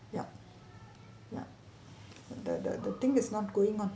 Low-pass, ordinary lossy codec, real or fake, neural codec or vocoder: none; none; real; none